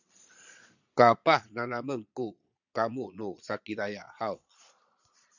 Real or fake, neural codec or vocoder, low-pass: fake; vocoder, 22.05 kHz, 80 mel bands, Vocos; 7.2 kHz